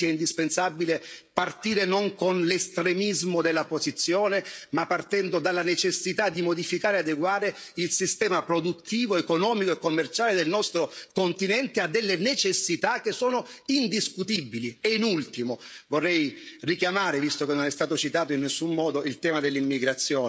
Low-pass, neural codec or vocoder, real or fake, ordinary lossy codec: none; codec, 16 kHz, 16 kbps, FreqCodec, smaller model; fake; none